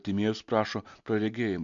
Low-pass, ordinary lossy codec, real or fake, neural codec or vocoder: 7.2 kHz; MP3, 48 kbps; real; none